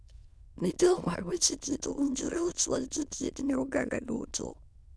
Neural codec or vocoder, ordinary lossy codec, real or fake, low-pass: autoencoder, 22.05 kHz, a latent of 192 numbers a frame, VITS, trained on many speakers; none; fake; none